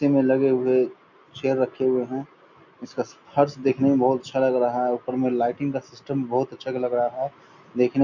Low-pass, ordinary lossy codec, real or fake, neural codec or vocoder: 7.2 kHz; none; real; none